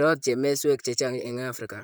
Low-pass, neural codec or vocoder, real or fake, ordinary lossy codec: none; vocoder, 44.1 kHz, 128 mel bands, Pupu-Vocoder; fake; none